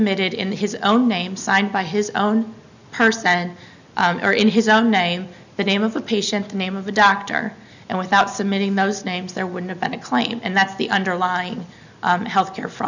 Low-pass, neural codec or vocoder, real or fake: 7.2 kHz; none; real